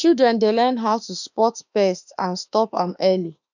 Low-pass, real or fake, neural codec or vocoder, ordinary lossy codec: 7.2 kHz; fake; autoencoder, 48 kHz, 32 numbers a frame, DAC-VAE, trained on Japanese speech; none